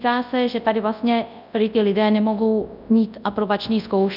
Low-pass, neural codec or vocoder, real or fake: 5.4 kHz; codec, 24 kHz, 0.9 kbps, WavTokenizer, large speech release; fake